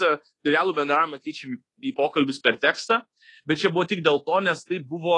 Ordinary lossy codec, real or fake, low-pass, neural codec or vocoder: AAC, 32 kbps; fake; 10.8 kHz; codec, 24 kHz, 1.2 kbps, DualCodec